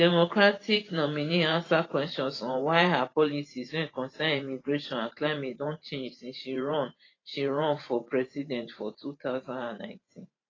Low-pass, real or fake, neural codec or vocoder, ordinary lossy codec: 7.2 kHz; fake; vocoder, 44.1 kHz, 128 mel bands every 512 samples, BigVGAN v2; AAC, 32 kbps